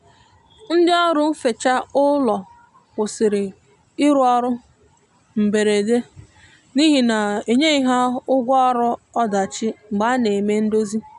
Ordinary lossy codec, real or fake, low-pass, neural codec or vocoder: none; real; 10.8 kHz; none